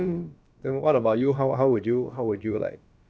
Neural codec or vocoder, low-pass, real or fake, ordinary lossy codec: codec, 16 kHz, about 1 kbps, DyCAST, with the encoder's durations; none; fake; none